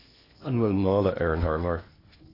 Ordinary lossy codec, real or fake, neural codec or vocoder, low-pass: AAC, 24 kbps; fake; codec, 16 kHz in and 24 kHz out, 0.8 kbps, FocalCodec, streaming, 65536 codes; 5.4 kHz